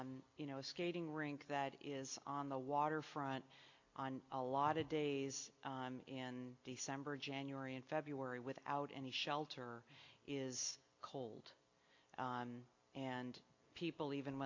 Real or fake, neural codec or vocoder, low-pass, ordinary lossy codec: real; none; 7.2 kHz; AAC, 48 kbps